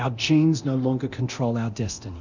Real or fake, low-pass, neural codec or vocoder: fake; 7.2 kHz; codec, 24 kHz, 0.9 kbps, DualCodec